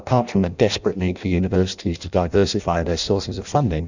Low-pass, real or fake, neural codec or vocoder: 7.2 kHz; fake; codec, 16 kHz in and 24 kHz out, 0.6 kbps, FireRedTTS-2 codec